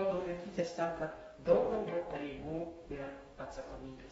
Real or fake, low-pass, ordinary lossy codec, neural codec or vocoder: fake; 19.8 kHz; AAC, 24 kbps; codec, 44.1 kHz, 2.6 kbps, DAC